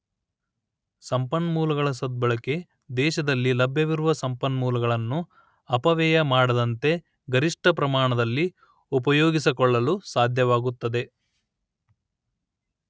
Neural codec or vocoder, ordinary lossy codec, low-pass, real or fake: none; none; none; real